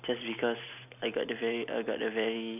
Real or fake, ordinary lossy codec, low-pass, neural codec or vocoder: real; none; 3.6 kHz; none